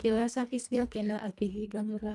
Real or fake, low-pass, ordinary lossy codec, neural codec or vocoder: fake; none; none; codec, 24 kHz, 1.5 kbps, HILCodec